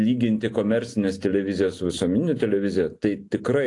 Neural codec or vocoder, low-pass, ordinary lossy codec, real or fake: none; 10.8 kHz; AAC, 48 kbps; real